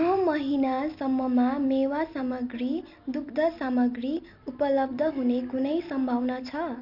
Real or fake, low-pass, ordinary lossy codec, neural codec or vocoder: real; 5.4 kHz; none; none